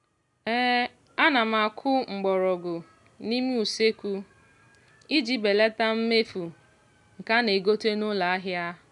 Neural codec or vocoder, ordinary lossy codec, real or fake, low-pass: none; none; real; 10.8 kHz